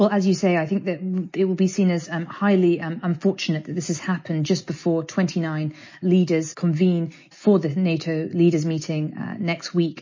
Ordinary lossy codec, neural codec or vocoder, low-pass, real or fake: MP3, 32 kbps; none; 7.2 kHz; real